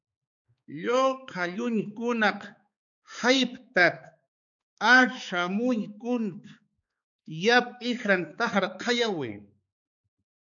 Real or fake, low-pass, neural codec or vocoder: fake; 7.2 kHz; codec, 16 kHz, 4 kbps, X-Codec, HuBERT features, trained on balanced general audio